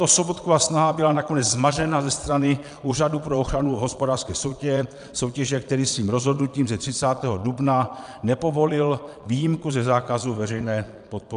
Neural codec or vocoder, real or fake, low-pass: vocoder, 22.05 kHz, 80 mel bands, Vocos; fake; 9.9 kHz